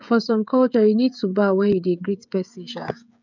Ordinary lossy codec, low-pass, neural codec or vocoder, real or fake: none; 7.2 kHz; codec, 16 kHz, 16 kbps, FreqCodec, smaller model; fake